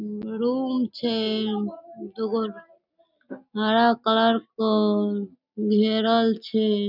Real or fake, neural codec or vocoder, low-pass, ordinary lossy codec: real; none; 5.4 kHz; none